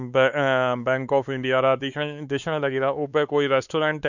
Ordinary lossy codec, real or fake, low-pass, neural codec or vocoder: none; fake; 7.2 kHz; codec, 16 kHz, 4 kbps, X-Codec, WavLM features, trained on Multilingual LibriSpeech